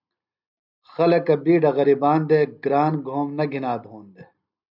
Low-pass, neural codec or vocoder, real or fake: 5.4 kHz; none; real